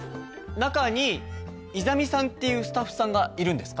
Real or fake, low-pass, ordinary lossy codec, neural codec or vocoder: real; none; none; none